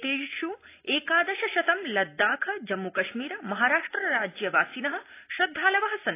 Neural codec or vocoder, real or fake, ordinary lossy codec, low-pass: none; real; AAC, 24 kbps; 3.6 kHz